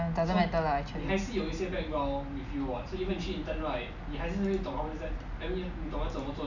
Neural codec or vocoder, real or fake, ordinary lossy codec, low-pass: none; real; none; 7.2 kHz